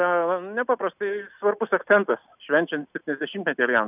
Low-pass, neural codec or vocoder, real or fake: 3.6 kHz; none; real